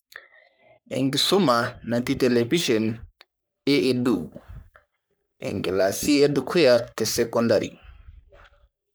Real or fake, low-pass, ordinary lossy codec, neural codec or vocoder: fake; none; none; codec, 44.1 kHz, 3.4 kbps, Pupu-Codec